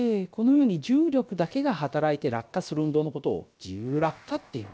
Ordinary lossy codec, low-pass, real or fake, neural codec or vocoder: none; none; fake; codec, 16 kHz, about 1 kbps, DyCAST, with the encoder's durations